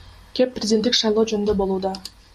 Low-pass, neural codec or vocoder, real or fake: 14.4 kHz; none; real